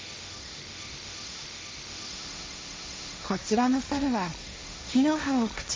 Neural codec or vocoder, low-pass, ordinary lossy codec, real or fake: codec, 16 kHz, 1.1 kbps, Voila-Tokenizer; none; none; fake